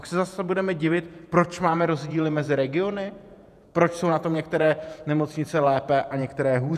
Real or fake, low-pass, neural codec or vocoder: fake; 14.4 kHz; vocoder, 48 kHz, 128 mel bands, Vocos